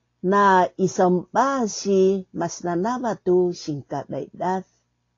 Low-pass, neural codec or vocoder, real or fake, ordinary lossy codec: 7.2 kHz; none; real; AAC, 32 kbps